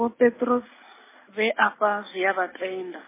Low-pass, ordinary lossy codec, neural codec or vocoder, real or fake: 3.6 kHz; MP3, 16 kbps; none; real